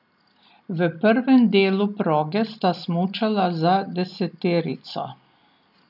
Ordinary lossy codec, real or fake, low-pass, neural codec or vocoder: none; real; 5.4 kHz; none